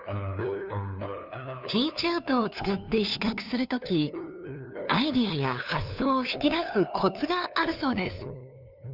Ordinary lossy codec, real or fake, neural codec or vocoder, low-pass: none; fake; codec, 16 kHz, 2 kbps, FunCodec, trained on LibriTTS, 25 frames a second; 5.4 kHz